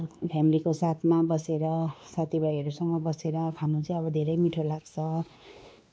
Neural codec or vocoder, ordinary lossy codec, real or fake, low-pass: codec, 16 kHz, 2 kbps, X-Codec, WavLM features, trained on Multilingual LibriSpeech; none; fake; none